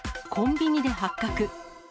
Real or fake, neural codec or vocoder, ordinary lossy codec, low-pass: real; none; none; none